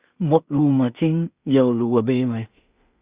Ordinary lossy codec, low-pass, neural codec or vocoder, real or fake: Opus, 64 kbps; 3.6 kHz; codec, 16 kHz in and 24 kHz out, 0.4 kbps, LongCat-Audio-Codec, two codebook decoder; fake